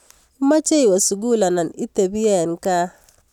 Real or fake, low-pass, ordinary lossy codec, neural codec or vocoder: real; 19.8 kHz; none; none